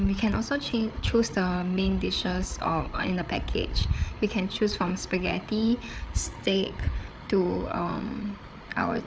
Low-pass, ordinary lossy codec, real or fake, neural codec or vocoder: none; none; fake; codec, 16 kHz, 8 kbps, FreqCodec, larger model